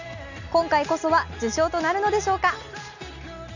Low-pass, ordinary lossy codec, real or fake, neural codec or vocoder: 7.2 kHz; none; real; none